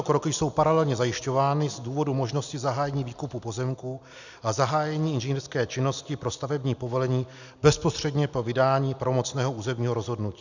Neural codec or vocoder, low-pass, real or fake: none; 7.2 kHz; real